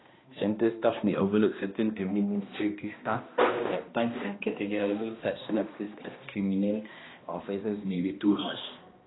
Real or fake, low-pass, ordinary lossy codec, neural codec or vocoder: fake; 7.2 kHz; AAC, 16 kbps; codec, 16 kHz, 1 kbps, X-Codec, HuBERT features, trained on balanced general audio